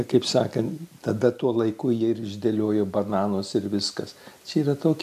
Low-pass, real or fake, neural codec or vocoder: 14.4 kHz; real; none